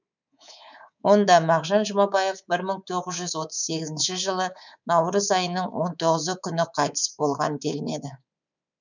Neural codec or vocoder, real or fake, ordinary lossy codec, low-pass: codec, 24 kHz, 3.1 kbps, DualCodec; fake; none; 7.2 kHz